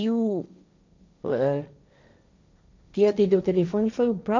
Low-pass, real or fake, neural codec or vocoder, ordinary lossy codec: 7.2 kHz; fake; codec, 16 kHz, 1.1 kbps, Voila-Tokenizer; MP3, 64 kbps